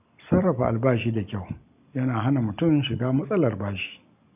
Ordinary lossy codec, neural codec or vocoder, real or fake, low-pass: AAC, 32 kbps; none; real; 3.6 kHz